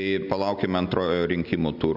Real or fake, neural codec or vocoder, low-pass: real; none; 5.4 kHz